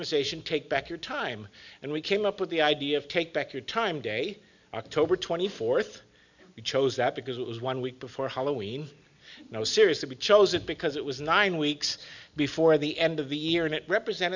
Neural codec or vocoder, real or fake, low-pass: none; real; 7.2 kHz